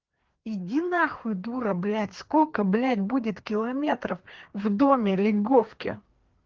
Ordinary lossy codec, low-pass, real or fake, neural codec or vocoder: Opus, 16 kbps; 7.2 kHz; fake; codec, 16 kHz, 2 kbps, FreqCodec, larger model